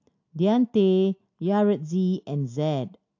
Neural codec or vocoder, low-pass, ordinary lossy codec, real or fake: none; 7.2 kHz; AAC, 48 kbps; real